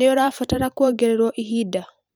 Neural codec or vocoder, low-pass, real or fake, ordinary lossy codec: vocoder, 44.1 kHz, 128 mel bands every 512 samples, BigVGAN v2; none; fake; none